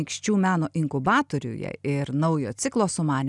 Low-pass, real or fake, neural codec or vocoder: 10.8 kHz; real; none